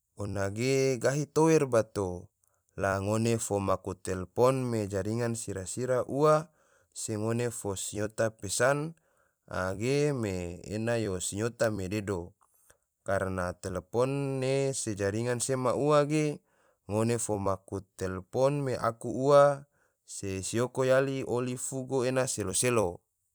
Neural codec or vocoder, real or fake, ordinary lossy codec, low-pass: vocoder, 44.1 kHz, 128 mel bands every 256 samples, BigVGAN v2; fake; none; none